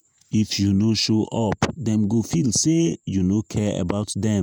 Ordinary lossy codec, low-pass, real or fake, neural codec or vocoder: none; none; fake; vocoder, 48 kHz, 128 mel bands, Vocos